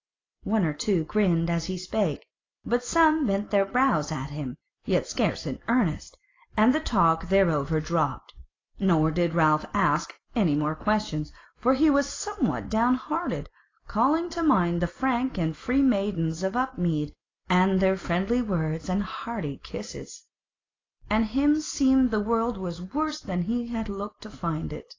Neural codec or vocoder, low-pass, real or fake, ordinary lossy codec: none; 7.2 kHz; real; AAC, 32 kbps